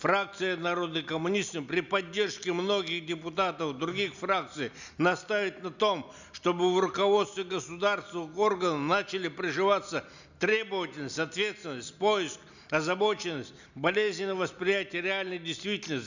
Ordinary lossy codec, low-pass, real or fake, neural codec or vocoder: none; 7.2 kHz; real; none